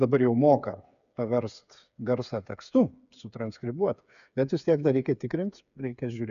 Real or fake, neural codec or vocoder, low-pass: fake; codec, 16 kHz, 8 kbps, FreqCodec, smaller model; 7.2 kHz